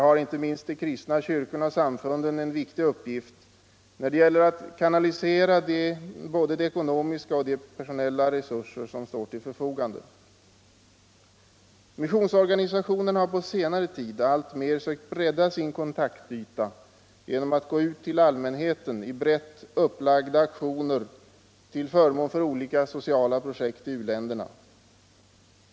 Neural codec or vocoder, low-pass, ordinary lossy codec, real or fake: none; none; none; real